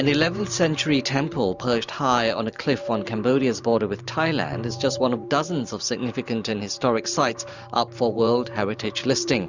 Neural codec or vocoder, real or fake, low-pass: vocoder, 44.1 kHz, 128 mel bands every 512 samples, BigVGAN v2; fake; 7.2 kHz